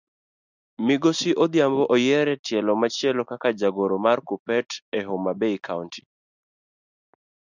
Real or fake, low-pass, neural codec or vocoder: real; 7.2 kHz; none